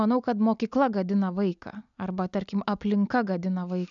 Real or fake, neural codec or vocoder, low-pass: real; none; 7.2 kHz